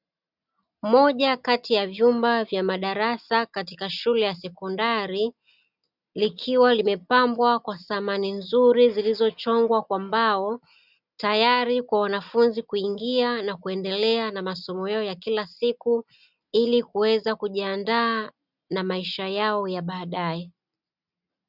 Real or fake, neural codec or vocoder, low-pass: real; none; 5.4 kHz